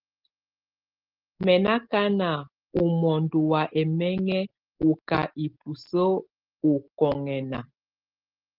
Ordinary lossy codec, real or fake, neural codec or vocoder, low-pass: Opus, 16 kbps; real; none; 5.4 kHz